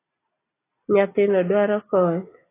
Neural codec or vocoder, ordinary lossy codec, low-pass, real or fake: none; AAC, 16 kbps; 3.6 kHz; real